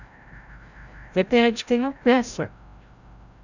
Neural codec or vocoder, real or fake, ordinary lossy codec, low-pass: codec, 16 kHz, 0.5 kbps, FreqCodec, larger model; fake; none; 7.2 kHz